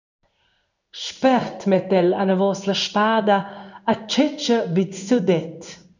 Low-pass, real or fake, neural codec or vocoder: 7.2 kHz; fake; codec, 16 kHz in and 24 kHz out, 1 kbps, XY-Tokenizer